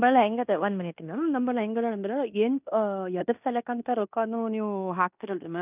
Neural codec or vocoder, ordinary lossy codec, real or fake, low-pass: codec, 16 kHz in and 24 kHz out, 0.9 kbps, LongCat-Audio-Codec, fine tuned four codebook decoder; none; fake; 3.6 kHz